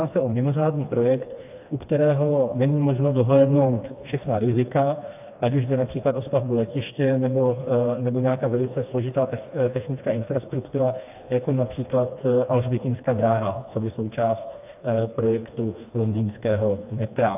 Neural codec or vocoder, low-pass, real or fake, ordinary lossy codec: codec, 16 kHz, 2 kbps, FreqCodec, smaller model; 3.6 kHz; fake; AAC, 32 kbps